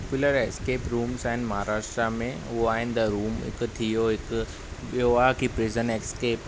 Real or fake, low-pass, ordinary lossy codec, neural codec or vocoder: real; none; none; none